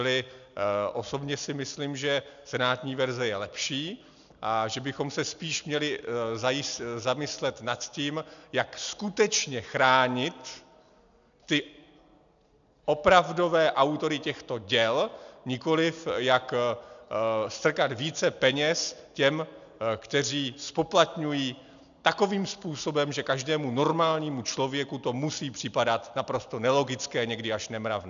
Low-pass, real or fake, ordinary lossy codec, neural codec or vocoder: 7.2 kHz; real; MP3, 96 kbps; none